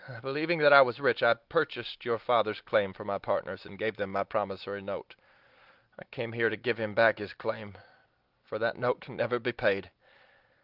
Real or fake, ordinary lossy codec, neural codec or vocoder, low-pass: real; Opus, 32 kbps; none; 5.4 kHz